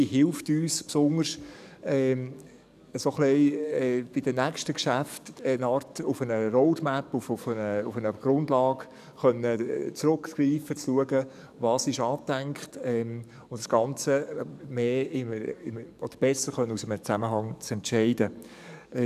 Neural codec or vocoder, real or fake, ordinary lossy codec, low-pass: codec, 44.1 kHz, 7.8 kbps, DAC; fake; none; 14.4 kHz